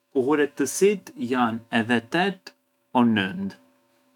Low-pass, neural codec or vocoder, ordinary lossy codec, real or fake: 19.8 kHz; autoencoder, 48 kHz, 128 numbers a frame, DAC-VAE, trained on Japanese speech; none; fake